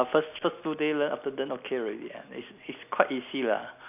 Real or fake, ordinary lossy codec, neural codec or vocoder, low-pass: real; none; none; 3.6 kHz